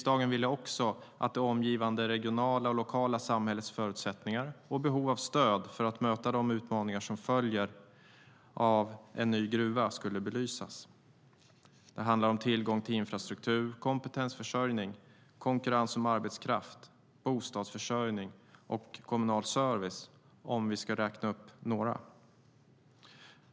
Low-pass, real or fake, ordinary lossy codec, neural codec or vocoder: none; real; none; none